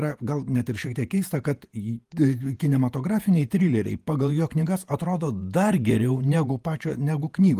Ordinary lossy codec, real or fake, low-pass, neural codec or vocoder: Opus, 32 kbps; fake; 14.4 kHz; vocoder, 48 kHz, 128 mel bands, Vocos